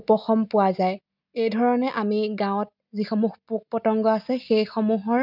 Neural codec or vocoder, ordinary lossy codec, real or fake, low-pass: none; none; real; 5.4 kHz